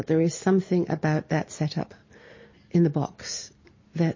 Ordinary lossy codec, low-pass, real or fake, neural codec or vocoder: MP3, 32 kbps; 7.2 kHz; real; none